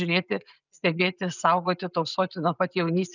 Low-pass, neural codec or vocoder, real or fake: 7.2 kHz; none; real